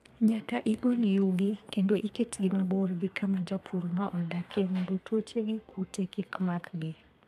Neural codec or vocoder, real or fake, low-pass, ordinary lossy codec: codec, 32 kHz, 1.9 kbps, SNAC; fake; 14.4 kHz; MP3, 96 kbps